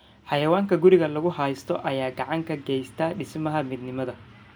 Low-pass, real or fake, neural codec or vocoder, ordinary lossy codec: none; real; none; none